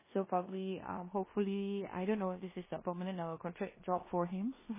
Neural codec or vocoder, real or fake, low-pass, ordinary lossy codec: codec, 16 kHz in and 24 kHz out, 0.9 kbps, LongCat-Audio-Codec, four codebook decoder; fake; 3.6 kHz; MP3, 16 kbps